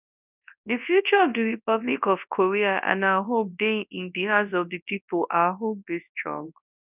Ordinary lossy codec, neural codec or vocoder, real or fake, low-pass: none; codec, 24 kHz, 0.9 kbps, WavTokenizer, large speech release; fake; 3.6 kHz